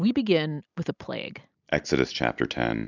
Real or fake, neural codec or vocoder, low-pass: real; none; 7.2 kHz